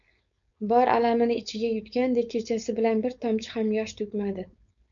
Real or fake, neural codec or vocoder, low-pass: fake; codec, 16 kHz, 4.8 kbps, FACodec; 7.2 kHz